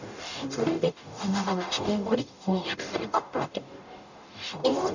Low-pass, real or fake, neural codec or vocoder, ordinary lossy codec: 7.2 kHz; fake; codec, 44.1 kHz, 0.9 kbps, DAC; none